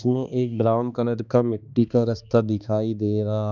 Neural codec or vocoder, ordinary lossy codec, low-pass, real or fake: codec, 16 kHz, 2 kbps, X-Codec, HuBERT features, trained on balanced general audio; none; 7.2 kHz; fake